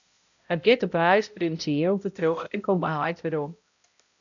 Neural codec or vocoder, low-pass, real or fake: codec, 16 kHz, 0.5 kbps, X-Codec, HuBERT features, trained on balanced general audio; 7.2 kHz; fake